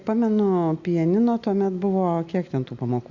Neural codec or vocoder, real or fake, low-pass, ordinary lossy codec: none; real; 7.2 kHz; Opus, 64 kbps